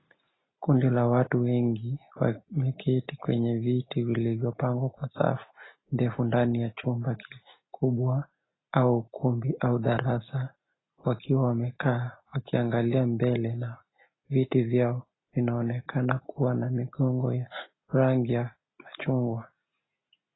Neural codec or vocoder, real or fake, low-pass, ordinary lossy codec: none; real; 7.2 kHz; AAC, 16 kbps